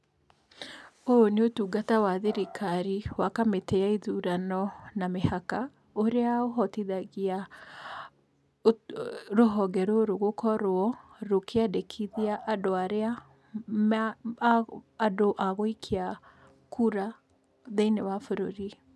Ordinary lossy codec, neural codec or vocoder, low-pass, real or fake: none; none; none; real